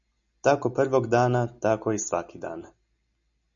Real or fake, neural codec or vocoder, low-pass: real; none; 7.2 kHz